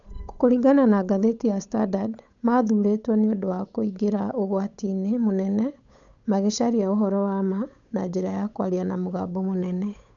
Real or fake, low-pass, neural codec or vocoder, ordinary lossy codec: fake; 7.2 kHz; codec, 16 kHz, 8 kbps, FunCodec, trained on Chinese and English, 25 frames a second; none